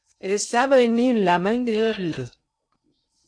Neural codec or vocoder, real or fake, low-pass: codec, 16 kHz in and 24 kHz out, 0.6 kbps, FocalCodec, streaming, 2048 codes; fake; 9.9 kHz